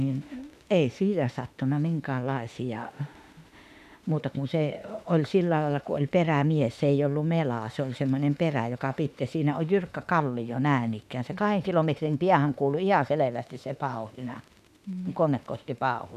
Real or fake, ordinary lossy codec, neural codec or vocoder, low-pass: fake; none; autoencoder, 48 kHz, 32 numbers a frame, DAC-VAE, trained on Japanese speech; 14.4 kHz